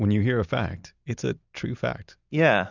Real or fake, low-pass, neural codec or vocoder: real; 7.2 kHz; none